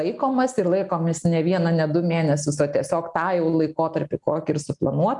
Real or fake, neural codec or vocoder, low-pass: real; none; 10.8 kHz